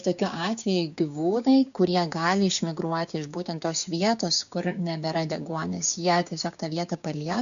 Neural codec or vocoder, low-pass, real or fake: codec, 16 kHz, 6 kbps, DAC; 7.2 kHz; fake